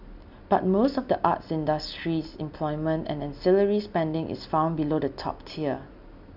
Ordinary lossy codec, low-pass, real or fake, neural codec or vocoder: none; 5.4 kHz; real; none